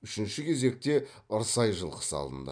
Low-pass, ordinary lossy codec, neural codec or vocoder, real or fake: 9.9 kHz; none; none; real